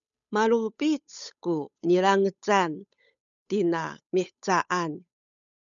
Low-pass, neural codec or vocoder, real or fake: 7.2 kHz; codec, 16 kHz, 8 kbps, FunCodec, trained on Chinese and English, 25 frames a second; fake